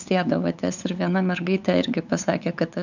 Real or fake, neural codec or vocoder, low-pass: fake; codec, 16 kHz, 8 kbps, FunCodec, trained on LibriTTS, 25 frames a second; 7.2 kHz